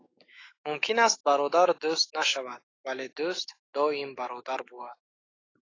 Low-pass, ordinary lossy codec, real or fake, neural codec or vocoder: 7.2 kHz; AAC, 32 kbps; fake; autoencoder, 48 kHz, 128 numbers a frame, DAC-VAE, trained on Japanese speech